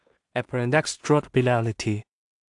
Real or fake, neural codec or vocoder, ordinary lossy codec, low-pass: fake; codec, 16 kHz in and 24 kHz out, 0.4 kbps, LongCat-Audio-Codec, two codebook decoder; AAC, 64 kbps; 10.8 kHz